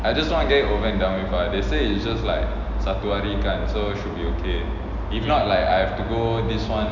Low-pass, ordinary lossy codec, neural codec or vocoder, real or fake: 7.2 kHz; none; none; real